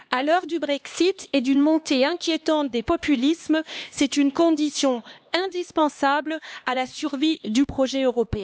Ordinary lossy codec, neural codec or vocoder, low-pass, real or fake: none; codec, 16 kHz, 2 kbps, X-Codec, HuBERT features, trained on LibriSpeech; none; fake